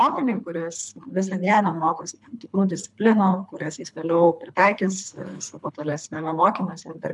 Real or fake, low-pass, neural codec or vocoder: fake; 10.8 kHz; codec, 24 kHz, 3 kbps, HILCodec